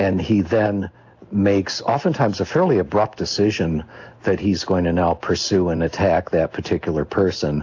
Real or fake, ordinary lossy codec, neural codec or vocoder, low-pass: real; AAC, 48 kbps; none; 7.2 kHz